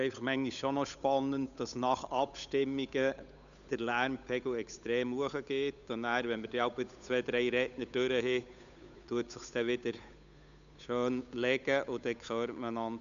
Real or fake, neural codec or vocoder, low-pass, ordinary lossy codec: fake; codec, 16 kHz, 8 kbps, FunCodec, trained on Chinese and English, 25 frames a second; 7.2 kHz; none